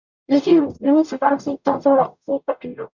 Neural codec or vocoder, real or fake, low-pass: codec, 44.1 kHz, 0.9 kbps, DAC; fake; 7.2 kHz